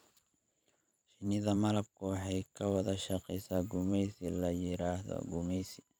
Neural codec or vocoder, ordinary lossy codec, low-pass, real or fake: vocoder, 44.1 kHz, 128 mel bands every 512 samples, BigVGAN v2; none; none; fake